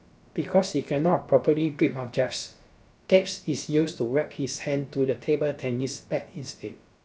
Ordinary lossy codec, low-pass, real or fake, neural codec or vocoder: none; none; fake; codec, 16 kHz, about 1 kbps, DyCAST, with the encoder's durations